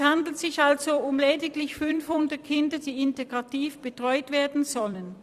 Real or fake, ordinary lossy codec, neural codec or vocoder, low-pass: fake; Opus, 64 kbps; vocoder, 44.1 kHz, 128 mel bands every 256 samples, BigVGAN v2; 14.4 kHz